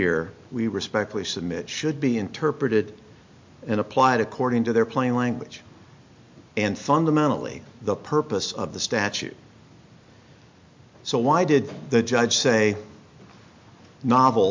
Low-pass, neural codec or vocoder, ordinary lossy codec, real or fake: 7.2 kHz; none; MP3, 48 kbps; real